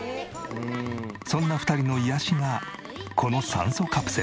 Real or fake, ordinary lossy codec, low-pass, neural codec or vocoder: real; none; none; none